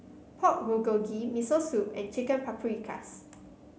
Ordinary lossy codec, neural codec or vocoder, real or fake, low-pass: none; none; real; none